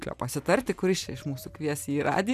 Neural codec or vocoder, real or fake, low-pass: none; real; 14.4 kHz